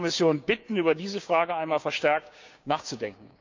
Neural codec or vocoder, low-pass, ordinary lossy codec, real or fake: codec, 16 kHz, 6 kbps, DAC; 7.2 kHz; MP3, 64 kbps; fake